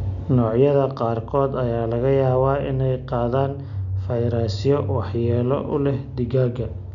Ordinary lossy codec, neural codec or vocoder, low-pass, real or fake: none; none; 7.2 kHz; real